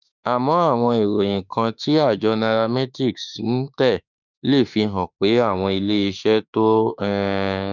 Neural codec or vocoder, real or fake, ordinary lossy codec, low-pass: autoencoder, 48 kHz, 32 numbers a frame, DAC-VAE, trained on Japanese speech; fake; none; 7.2 kHz